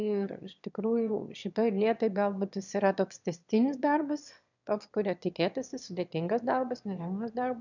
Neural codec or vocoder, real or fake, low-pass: autoencoder, 22.05 kHz, a latent of 192 numbers a frame, VITS, trained on one speaker; fake; 7.2 kHz